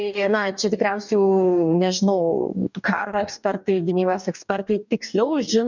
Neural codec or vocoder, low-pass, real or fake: codec, 44.1 kHz, 2.6 kbps, DAC; 7.2 kHz; fake